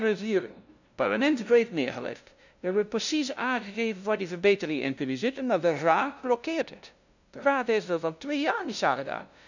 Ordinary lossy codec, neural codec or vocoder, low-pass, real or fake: none; codec, 16 kHz, 0.5 kbps, FunCodec, trained on LibriTTS, 25 frames a second; 7.2 kHz; fake